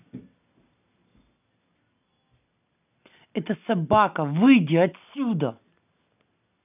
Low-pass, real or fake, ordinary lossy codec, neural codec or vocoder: 3.6 kHz; real; none; none